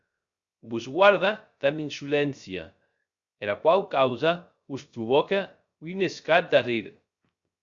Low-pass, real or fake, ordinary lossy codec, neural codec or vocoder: 7.2 kHz; fake; Opus, 64 kbps; codec, 16 kHz, 0.3 kbps, FocalCodec